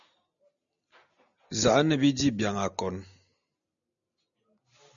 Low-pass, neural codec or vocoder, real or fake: 7.2 kHz; none; real